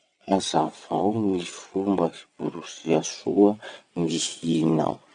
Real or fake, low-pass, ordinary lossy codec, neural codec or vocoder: fake; 9.9 kHz; none; vocoder, 22.05 kHz, 80 mel bands, WaveNeXt